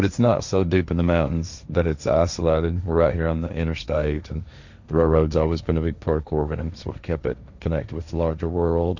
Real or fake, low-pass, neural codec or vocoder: fake; 7.2 kHz; codec, 16 kHz, 1.1 kbps, Voila-Tokenizer